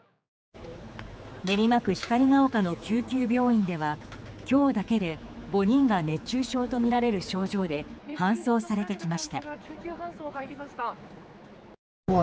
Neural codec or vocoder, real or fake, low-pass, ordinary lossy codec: codec, 16 kHz, 4 kbps, X-Codec, HuBERT features, trained on general audio; fake; none; none